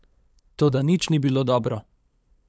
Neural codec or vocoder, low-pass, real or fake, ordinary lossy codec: codec, 16 kHz, 8 kbps, FunCodec, trained on LibriTTS, 25 frames a second; none; fake; none